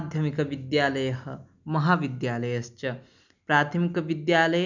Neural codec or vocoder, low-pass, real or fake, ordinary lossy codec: none; 7.2 kHz; real; none